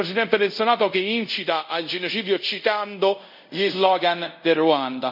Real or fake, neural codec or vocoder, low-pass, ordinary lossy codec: fake; codec, 24 kHz, 0.5 kbps, DualCodec; 5.4 kHz; none